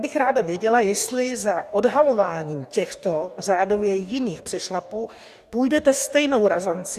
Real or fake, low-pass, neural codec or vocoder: fake; 14.4 kHz; codec, 44.1 kHz, 2.6 kbps, DAC